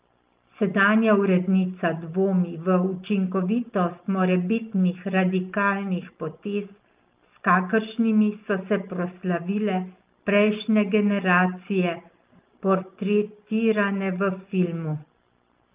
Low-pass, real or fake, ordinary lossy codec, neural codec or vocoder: 3.6 kHz; real; Opus, 24 kbps; none